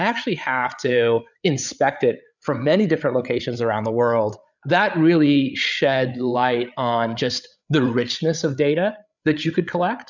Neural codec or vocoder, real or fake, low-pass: codec, 16 kHz, 8 kbps, FreqCodec, larger model; fake; 7.2 kHz